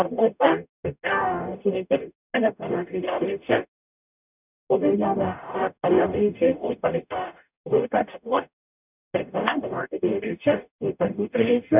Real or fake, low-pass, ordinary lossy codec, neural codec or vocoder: fake; 3.6 kHz; none; codec, 44.1 kHz, 0.9 kbps, DAC